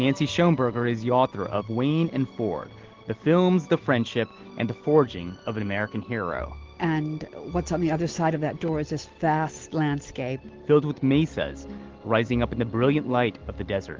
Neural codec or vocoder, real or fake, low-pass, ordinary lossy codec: none; real; 7.2 kHz; Opus, 16 kbps